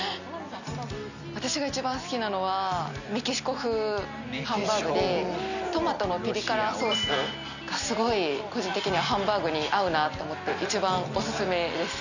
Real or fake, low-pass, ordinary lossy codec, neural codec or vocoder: real; 7.2 kHz; none; none